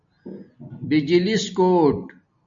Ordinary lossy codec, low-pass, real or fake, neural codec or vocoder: MP3, 48 kbps; 7.2 kHz; real; none